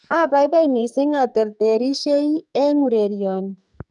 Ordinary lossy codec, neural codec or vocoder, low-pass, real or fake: none; codec, 44.1 kHz, 2.6 kbps, SNAC; 10.8 kHz; fake